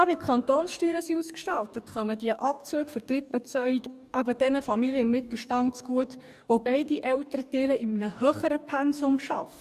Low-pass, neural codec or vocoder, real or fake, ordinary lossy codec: 14.4 kHz; codec, 44.1 kHz, 2.6 kbps, DAC; fake; none